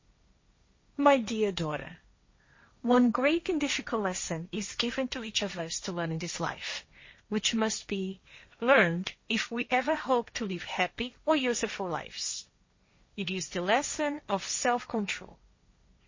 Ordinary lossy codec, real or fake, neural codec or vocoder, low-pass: MP3, 32 kbps; fake; codec, 16 kHz, 1.1 kbps, Voila-Tokenizer; 7.2 kHz